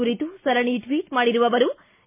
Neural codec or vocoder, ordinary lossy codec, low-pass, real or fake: none; MP3, 24 kbps; 3.6 kHz; real